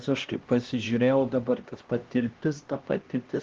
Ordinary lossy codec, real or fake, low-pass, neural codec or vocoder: Opus, 16 kbps; fake; 7.2 kHz; codec, 16 kHz, 1 kbps, X-Codec, HuBERT features, trained on LibriSpeech